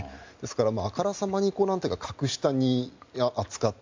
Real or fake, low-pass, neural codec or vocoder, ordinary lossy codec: real; 7.2 kHz; none; MP3, 64 kbps